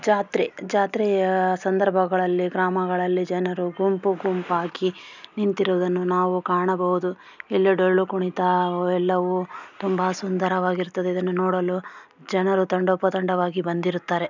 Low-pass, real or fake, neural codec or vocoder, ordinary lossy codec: 7.2 kHz; real; none; none